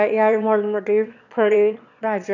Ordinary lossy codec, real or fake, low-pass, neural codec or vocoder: none; fake; 7.2 kHz; autoencoder, 22.05 kHz, a latent of 192 numbers a frame, VITS, trained on one speaker